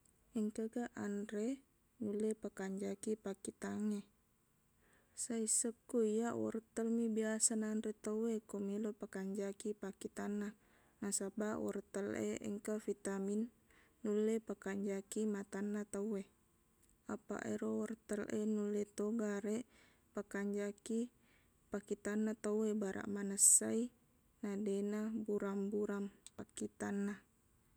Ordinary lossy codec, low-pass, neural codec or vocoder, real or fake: none; none; none; real